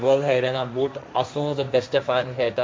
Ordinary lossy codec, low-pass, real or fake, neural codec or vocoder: none; none; fake; codec, 16 kHz, 1.1 kbps, Voila-Tokenizer